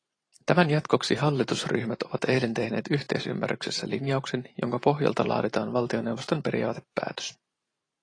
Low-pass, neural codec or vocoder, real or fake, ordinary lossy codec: 9.9 kHz; vocoder, 44.1 kHz, 128 mel bands every 512 samples, BigVGAN v2; fake; AAC, 32 kbps